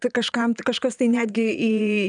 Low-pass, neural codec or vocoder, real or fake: 9.9 kHz; vocoder, 22.05 kHz, 80 mel bands, Vocos; fake